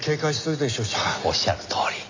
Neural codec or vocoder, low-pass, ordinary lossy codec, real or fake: none; 7.2 kHz; AAC, 48 kbps; real